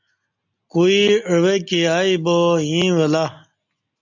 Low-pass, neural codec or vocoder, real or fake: 7.2 kHz; none; real